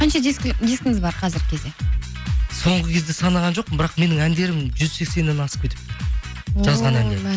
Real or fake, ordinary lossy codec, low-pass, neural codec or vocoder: real; none; none; none